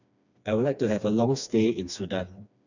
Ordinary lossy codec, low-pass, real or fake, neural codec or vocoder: none; 7.2 kHz; fake; codec, 16 kHz, 2 kbps, FreqCodec, smaller model